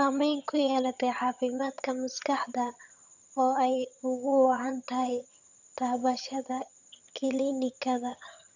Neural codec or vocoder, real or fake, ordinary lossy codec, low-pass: vocoder, 22.05 kHz, 80 mel bands, HiFi-GAN; fake; none; 7.2 kHz